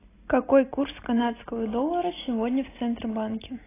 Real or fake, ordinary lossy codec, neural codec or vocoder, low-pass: real; AAC, 16 kbps; none; 3.6 kHz